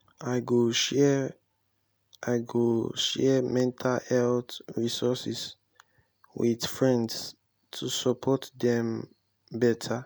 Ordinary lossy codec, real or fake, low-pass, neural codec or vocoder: none; real; none; none